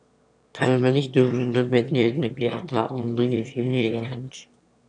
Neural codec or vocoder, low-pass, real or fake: autoencoder, 22.05 kHz, a latent of 192 numbers a frame, VITS, trained on one speaker; 9.9 kHz; fake